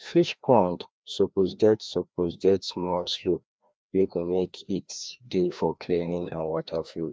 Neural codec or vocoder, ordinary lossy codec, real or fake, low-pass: codec, 16 kHz, 1 kbps, FreqCodec, larger model; none; fake; none